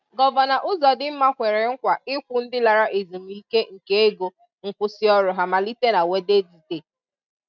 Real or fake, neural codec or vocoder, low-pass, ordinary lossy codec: real; none; 7.2 kHz; none